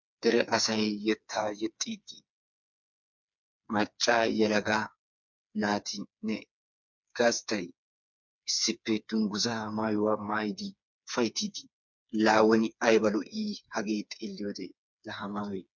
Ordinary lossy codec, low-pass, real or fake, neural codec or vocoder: MP3, 64 kbps; 7.2 kHz; fake; codec, 16 kHz, 4 kbps, FreqCodec, smaller model